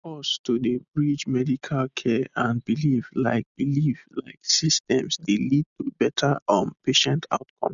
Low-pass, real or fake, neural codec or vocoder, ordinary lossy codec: 7.2 kHz; real; none; none